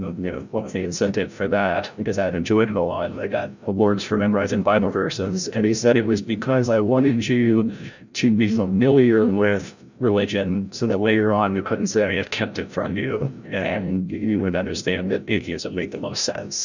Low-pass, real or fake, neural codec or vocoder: 7.2 kHz; fake; codec, 16 kHz, 0.5 kbps, FreqCodec, larger model